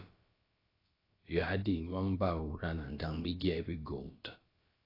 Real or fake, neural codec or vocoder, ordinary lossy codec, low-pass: fake; codec, 16 kHz, about 1 kbps, DyCAST, with the encoder's durations; AAC, 24 kbps; 5.4 kHz